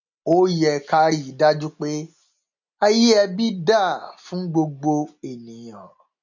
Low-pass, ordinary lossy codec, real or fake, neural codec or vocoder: 7.2 kHz; none; real; none